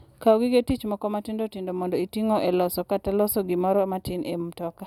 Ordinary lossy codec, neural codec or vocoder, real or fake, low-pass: none; none; real; 19.8 kHz